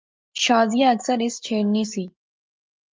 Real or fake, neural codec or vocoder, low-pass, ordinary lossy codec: real; none; 7.2 kHz; Opus, 24 kbps